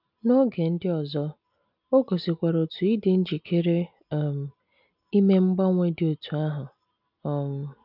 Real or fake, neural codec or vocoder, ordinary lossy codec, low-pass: real; none; none; 5.4 kHz